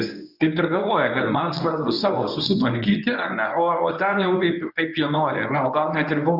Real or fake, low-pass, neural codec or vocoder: fake; 5.4 kHz; codec, 24 kHz, 0.9 kbps, WavTokenizer, medium speech release version 1